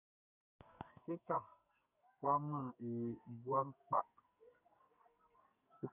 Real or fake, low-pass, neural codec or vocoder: fake; 3.6 kHz; codec, 44.1 kHz, 2.6 kbps, SNAC